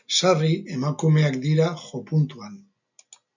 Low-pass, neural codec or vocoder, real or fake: 7.2 kHz; none; real